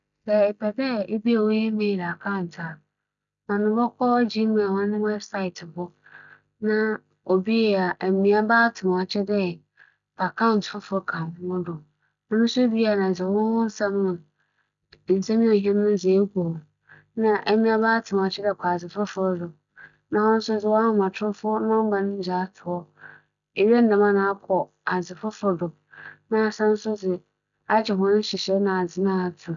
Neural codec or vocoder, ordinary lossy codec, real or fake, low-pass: none; none; real; 7.2 kHz